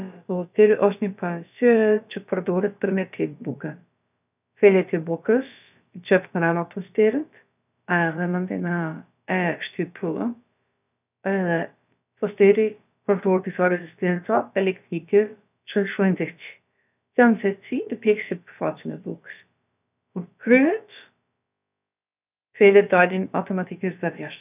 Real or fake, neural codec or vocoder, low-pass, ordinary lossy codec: fake; codec, 16 kHz, about 1 kbps, DyCAST, with the encoder's durations; 3.6 kHz; none